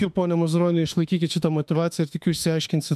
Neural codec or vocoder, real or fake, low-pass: autoencoder, 48 kHz, 32 numbers a frame, DAC-VAE, trained on Japanese speech; fake; 14.4 kHz